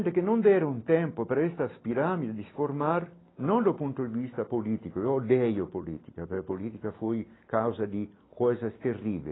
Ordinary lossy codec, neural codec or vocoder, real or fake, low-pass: AAC, 16 kbps; none; real; 7.2 kHz